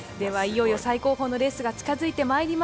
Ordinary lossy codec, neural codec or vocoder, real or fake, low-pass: none; none; real; none